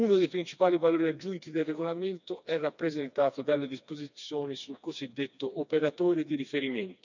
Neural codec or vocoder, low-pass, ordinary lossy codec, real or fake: codec, 16 kHz, 2 kbps, FreqCodec, smaller model; 7.2 kHz; none; fake